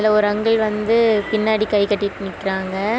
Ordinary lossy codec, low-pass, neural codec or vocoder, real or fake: none; none; none; real